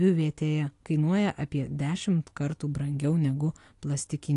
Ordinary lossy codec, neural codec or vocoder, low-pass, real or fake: AAC, 48 kbps; none; 10.8 kHz; real